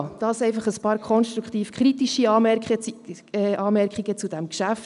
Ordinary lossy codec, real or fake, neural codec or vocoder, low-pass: none; real; none; 10.8 kHz